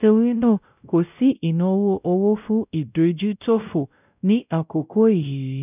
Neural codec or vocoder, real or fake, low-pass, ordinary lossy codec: codec, 16 kHz, 0.5 kbps, X-Codec, WavLM features, trained on Multilingual LibriSpeech; fake; 3.6 kHz; none